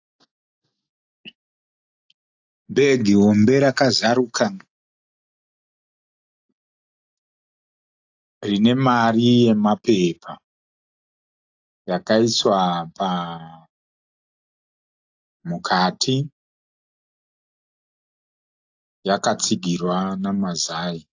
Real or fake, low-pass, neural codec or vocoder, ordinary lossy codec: real; 7.2 kHz; none; AAC, 48 kbps